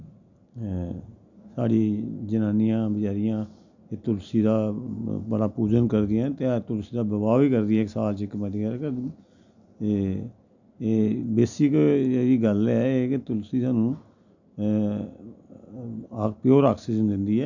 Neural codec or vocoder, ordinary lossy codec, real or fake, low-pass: none; none; real; 7.2 kHz